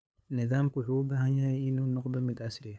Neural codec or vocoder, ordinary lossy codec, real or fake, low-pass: codec, 16 kHz, 8 kbps, FunCodec, trained on LibriTTS, 25 frames a second; none; fake; none